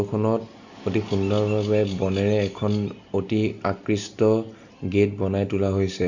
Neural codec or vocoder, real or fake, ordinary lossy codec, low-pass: none; real; none; 7.2 kHz